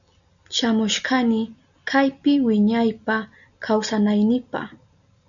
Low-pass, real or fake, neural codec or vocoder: 7.2 kHz; real; none